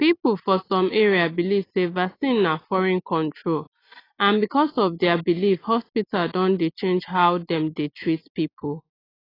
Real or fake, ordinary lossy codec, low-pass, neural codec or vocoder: real; AAC, 24 kbps; 5.4 kHz; none